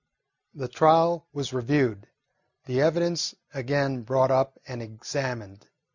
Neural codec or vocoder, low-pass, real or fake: none; 7.2 kHz; real